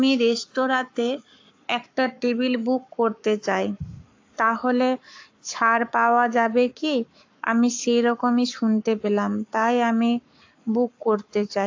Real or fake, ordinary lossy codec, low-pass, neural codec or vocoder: fake; AAC, 48 kbps; 7.2 kHz; codec, 44.1 kHz, 7.8 kbps, Pupu-Codec